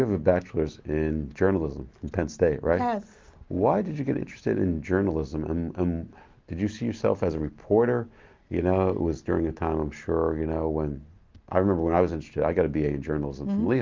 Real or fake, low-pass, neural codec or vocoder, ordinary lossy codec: real; 7.2 kHz; none; Opus, 32 kbps